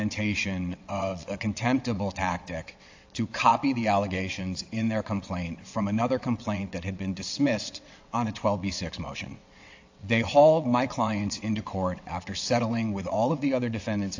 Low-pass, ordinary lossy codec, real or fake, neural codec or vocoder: 7.2 kHz; AAC, 48 kbps; fake; vocoder, 22.05 kHz, 80 mel bands, WaveNeXt